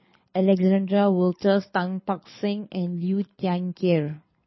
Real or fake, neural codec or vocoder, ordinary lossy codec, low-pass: fake; codec, 24 kHz, 6 kbps, HILCodec; MP3, 24 kbps; 7.2 kHz